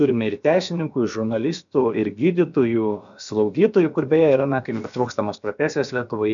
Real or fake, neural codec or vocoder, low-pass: fake; codec, 16 kHz, about 1 kbps, DyCAST, with the encoder's durations; 7.2 kHz